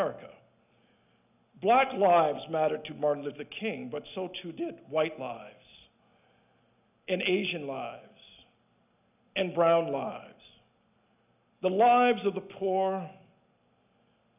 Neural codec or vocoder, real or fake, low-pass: none; real; 3.6 kHz